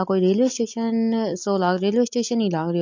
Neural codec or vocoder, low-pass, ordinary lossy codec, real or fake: none; 7.2 kHz; MP3, 48 kbps; real